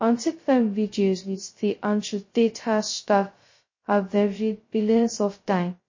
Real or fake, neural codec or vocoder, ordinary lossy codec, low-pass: fake; codec, 16 kHz, 0.2 kbps, FocalCodec; MP3, 32 kbps; 7.2 kHz